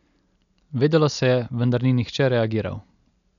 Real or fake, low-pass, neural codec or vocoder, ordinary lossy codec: real; 7.2 kHz; none; none